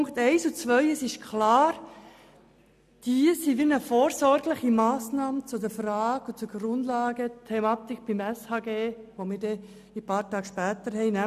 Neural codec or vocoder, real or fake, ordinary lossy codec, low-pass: none; real; none; 14.4 kHz